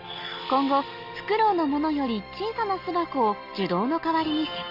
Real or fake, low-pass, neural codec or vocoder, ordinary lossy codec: real; 5.4 kHz; none; Opus, 32 kbps